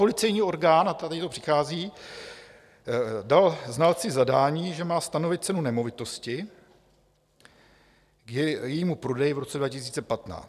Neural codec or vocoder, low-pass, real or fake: none; 14.4 kHz; real